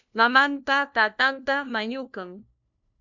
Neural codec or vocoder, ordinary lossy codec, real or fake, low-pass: codec, 16 kHz, 1 kbps, FunCodec, trained on LibriTTS, 50 frames a second; MP3, 64 kbps; fake; 7.2 kHz